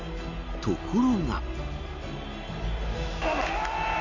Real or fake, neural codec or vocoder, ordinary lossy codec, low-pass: real; none; none; 7.2 kHz